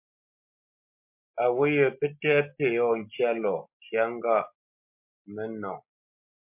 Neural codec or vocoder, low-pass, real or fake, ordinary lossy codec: none; 3.6 kHz; real; MP3, 32 kbps